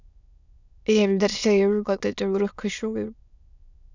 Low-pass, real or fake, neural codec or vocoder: 7.2 kHz; fake; autoencoder, 22.05 kHz, a latent of 192 numbers a frame, VITS, trained on many speakers